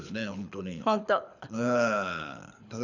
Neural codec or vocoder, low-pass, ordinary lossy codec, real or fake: codec, 24 kHz, 6 kbps, HILCodec; 7.2 kHz; none; fake